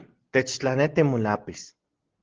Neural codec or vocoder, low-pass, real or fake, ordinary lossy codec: none; 7.2 kHz; real; Opus, 16 kbps